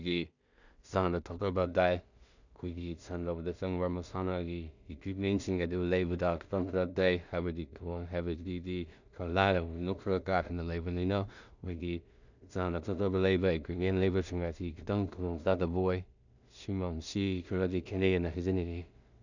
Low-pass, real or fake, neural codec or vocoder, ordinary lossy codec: 7.2 kHz; fake; codec, 16 kHz in and 24 kHz out, 0.4 kbps, LongCat-Audio-Codec, two codebook decoder; none